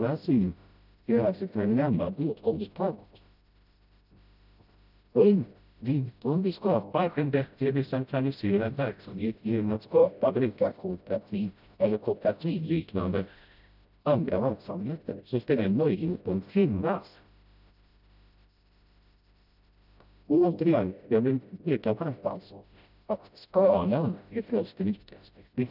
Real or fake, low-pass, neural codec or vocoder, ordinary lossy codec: fake; 5.4 kHz; codec, 16 kHz, 0.5 kbps, FreqCodec, smaller model; none